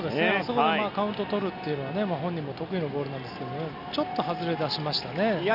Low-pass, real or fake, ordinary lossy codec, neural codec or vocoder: 5.4 kHz; real; none; none